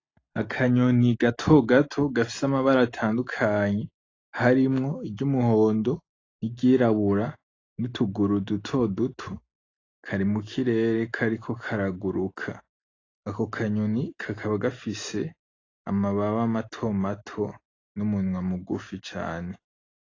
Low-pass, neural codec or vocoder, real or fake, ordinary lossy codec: 7.2 kHz; none; real; AAC, 32 kbps